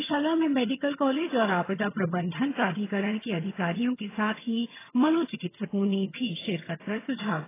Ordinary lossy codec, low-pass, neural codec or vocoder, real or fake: AAC, 16 kbps; 3.6 kHz; vocoder, 22.05 kHz, 80 mel bands, HiFi-GAN; fake